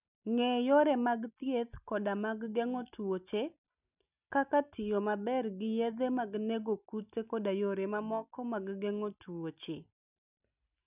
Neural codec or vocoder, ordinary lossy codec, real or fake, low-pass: none; none; real; 3.6 kHz